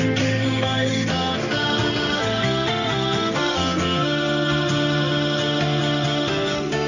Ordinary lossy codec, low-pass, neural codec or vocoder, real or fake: none; 7.2 kHz; codec, 16 kHz in and 24 kHz out, 1 kbps, XY-Tokenizer; fake